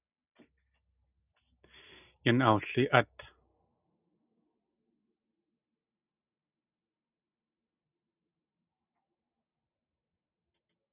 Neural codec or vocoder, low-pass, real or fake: none; 3.6 kHz; real